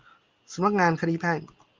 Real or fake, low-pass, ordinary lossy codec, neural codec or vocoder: real; 7.2 kHz; Opus, 32 kbps; none